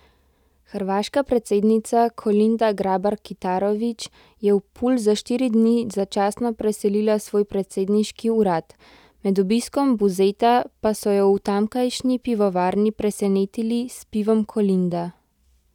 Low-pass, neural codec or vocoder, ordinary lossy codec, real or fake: 19.8 kHz; none; none; real